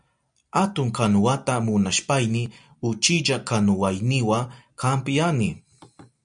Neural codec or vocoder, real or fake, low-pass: none; real; 9.9 kHz